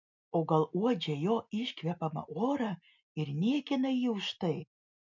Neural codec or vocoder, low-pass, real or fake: none; 7.2 kHz; real